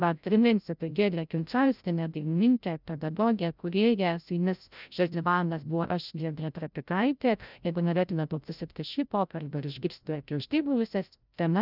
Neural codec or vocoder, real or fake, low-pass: codec, 16 kHz, 0.5 kbps, FreqCodec, larger model; fake; 5.4 kHz